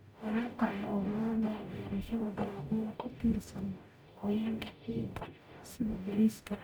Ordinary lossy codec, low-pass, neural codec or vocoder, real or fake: none; none; codec, 44.1 kHz, 0.9 kbps, DAC; fake